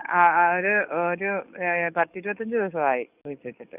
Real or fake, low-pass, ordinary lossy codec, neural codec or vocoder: fake; 3.6 kHz; none; codec, 44.1 kHz, 7.8 kbps, DAC